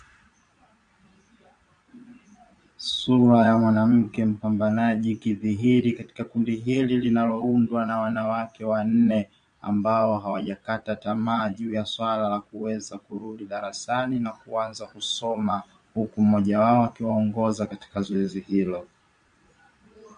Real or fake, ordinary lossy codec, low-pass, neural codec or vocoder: fake; MP3, 48 kbps; 9.9 kHz; vocoder, 22.05 kHz, 80 mel bands, Vocos